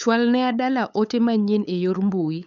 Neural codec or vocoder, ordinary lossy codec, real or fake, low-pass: codec, 16 kHz, 8 kbps, FunCodec, trained on LibriTTS, 25 frames a second; none; fake; 7.2 kHz